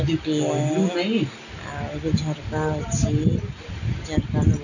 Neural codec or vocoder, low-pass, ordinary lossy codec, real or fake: none; 7.2 kHz; none; real